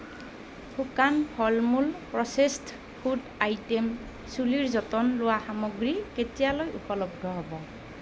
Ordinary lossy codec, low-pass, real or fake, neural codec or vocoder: none; none; real; none